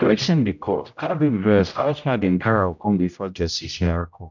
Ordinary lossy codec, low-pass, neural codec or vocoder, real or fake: none; 7.2 kHz; codec, 16 kHz, 0.5 kbps, X-Codec, HuBERT features, trained on general audio; fake